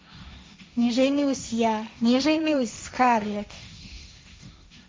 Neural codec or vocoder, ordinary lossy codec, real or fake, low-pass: codec, 16 kHz, 1.1 kbps, Voila-Tokenizer; none; fake; none